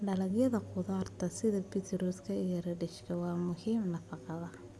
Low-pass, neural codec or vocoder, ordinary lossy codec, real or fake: none; none; none; real